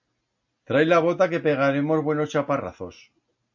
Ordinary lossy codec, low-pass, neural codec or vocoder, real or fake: MP3, 48 kbps; 7.2 kHz; none; real